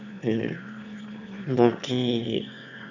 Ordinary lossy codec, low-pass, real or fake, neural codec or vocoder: none; 7.2 kHz; fake; autoencoder, 22.05 kHz, a latent of 192 numbers a frame, VITS, trained on one speaker